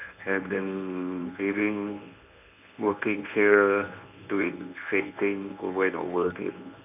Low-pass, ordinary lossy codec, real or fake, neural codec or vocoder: 3.6 kHz; none; fake; codec, 24 kHz, 0.9 kbps, WavTokenizer, medium speech release version 1